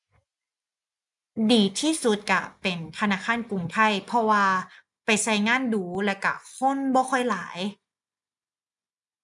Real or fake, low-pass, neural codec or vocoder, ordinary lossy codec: real; 10.8 kHz; none; none